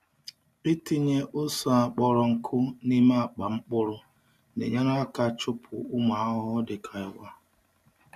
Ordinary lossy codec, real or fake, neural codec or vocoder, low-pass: none; real; none; 14.4 kHz